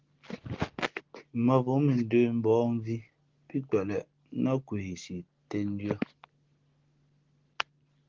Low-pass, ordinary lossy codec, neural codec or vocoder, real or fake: 7.2 kHz; Opus, 16 kbps; none; real